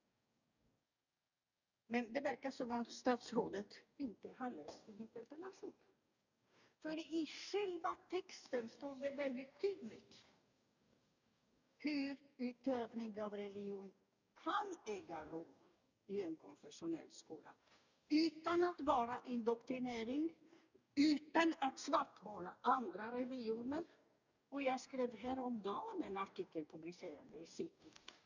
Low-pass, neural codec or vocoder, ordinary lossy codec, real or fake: 7.2 kHz; codec, 44.1 kHz, 2.6 kbps, DAC; none; fake